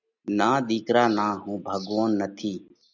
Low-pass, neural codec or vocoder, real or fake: 7.2 kHz; none; real